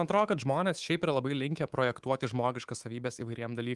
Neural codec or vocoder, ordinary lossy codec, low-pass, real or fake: codec, 24 kHz, 3.1 kbps, DualCodec; Opus, 24 kbps; 10.8 kHz; fake